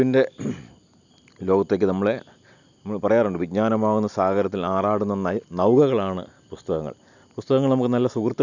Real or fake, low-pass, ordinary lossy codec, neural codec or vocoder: real; 7.2 kHz; none; none